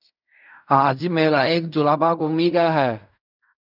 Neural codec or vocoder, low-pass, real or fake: codec, 16 kHz in and 24 kHz out, 0.4 kbps, LongCat-Audio-Codec, fine tuned four codebook decoder; 5.4 kHz; fake